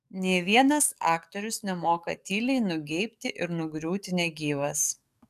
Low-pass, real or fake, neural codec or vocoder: 14.4 kHz; fake; codec, 44.1 kHz, 7.8 kbps, DAC